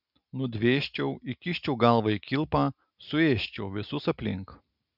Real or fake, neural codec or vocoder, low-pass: real; none; 5.4 kHz